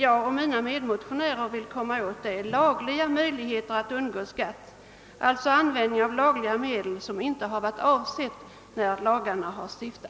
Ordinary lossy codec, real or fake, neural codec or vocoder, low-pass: none; real; none; none